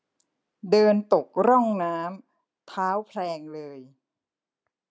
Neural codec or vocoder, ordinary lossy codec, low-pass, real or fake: none; none; none; real